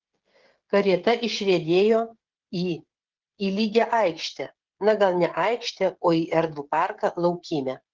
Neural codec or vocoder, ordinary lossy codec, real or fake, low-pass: codec, 16 kHz, 16 kbps, FreqCodec, smaller model; Opus, 16 kbps; fake; 7.2 kHz